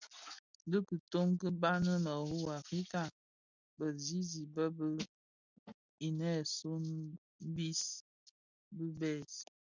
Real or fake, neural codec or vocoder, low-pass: real; none; 7.2 kHz